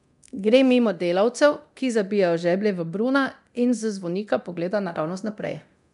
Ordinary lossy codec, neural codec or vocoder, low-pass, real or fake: none; codec, 24 kHz, 0.9 kbps, DualCodec; 10.8 kHz; fake